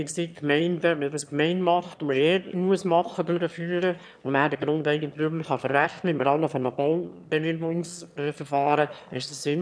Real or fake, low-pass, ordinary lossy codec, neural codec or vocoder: fake; none; none; autoencoder, 22.05 kHz, a latent of 192 numbers a frame, VITS, trained on one speaker